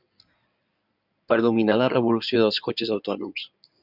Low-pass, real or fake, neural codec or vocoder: 5.4 kHz; fake; codec, 16 kHz in and 24 kHz out, 2.2 kbps, FireRedTTS-2 codec